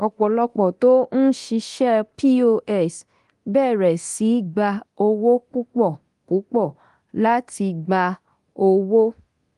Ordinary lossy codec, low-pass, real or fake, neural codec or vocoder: Opus, 24 kbps; 10.8 kHz; fake; codec, 24 kHz, 0.9 kbps, DualCodec